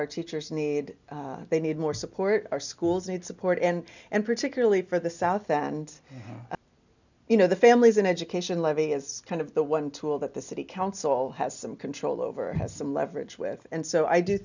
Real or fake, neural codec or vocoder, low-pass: real; none; 7.2 kHz